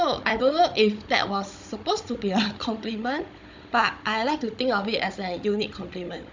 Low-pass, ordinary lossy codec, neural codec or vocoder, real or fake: 7.2 kHz; MP3, 64 kbps; codec, 16 kHz, 16 kbps, FunCodec, trained on Chinese and English, 50 frames a second; fake